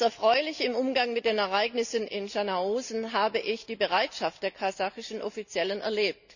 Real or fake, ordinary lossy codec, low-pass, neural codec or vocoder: real; none; 7.2 kHz; none